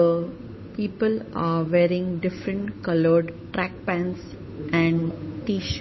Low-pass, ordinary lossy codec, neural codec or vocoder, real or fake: 7.2 kHz; MP3, 24 kbps; codec, 16 kHz, 8 kbps, FunCodec, trained on Chinese and English, 25 frames a second; fake